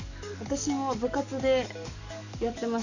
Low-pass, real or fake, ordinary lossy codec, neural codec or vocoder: 7.2 kHz; fake; none; codec, 44.1 kHz, 7.8 kbps, DAC